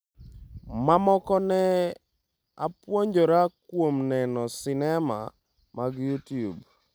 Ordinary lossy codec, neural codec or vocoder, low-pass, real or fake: none; none; none; real